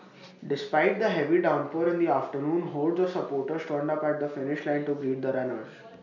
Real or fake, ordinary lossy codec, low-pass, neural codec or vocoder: real; none; 7.2 kHz; none